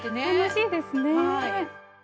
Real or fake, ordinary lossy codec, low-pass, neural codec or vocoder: real; none; none; none